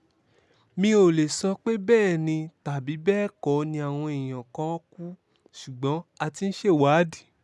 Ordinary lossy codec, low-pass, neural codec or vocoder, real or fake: none; none; none; real